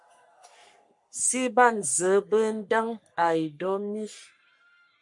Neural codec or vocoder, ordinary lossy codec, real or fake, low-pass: codec, 44.1 kHz, 3.4 kbps, Pupu-Codec; MP3, 64 kbps; fake; 10.8 kHz